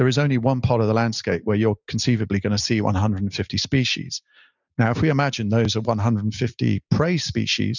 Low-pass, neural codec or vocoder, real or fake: 7.2 kHz; none; real